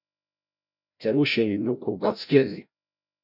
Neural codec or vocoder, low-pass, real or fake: codec, 16 kHz, 0.5 kbps, FreqCodec, larger model; 5.4 kHz; fake